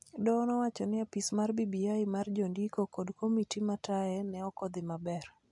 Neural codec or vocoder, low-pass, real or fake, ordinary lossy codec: none; 10.8 kHz; real; MP3, 64 kbps